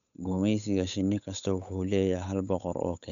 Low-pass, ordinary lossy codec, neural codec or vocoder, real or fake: 7.2 kHz; none; codec, 16 kHz, 8 kbps, FunCodec, trained on Chinese and English, 25 frames a second; fake